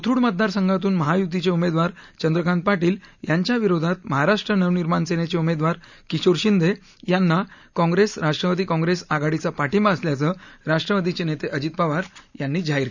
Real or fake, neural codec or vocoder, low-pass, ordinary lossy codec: real; none; 7.2 kHz; none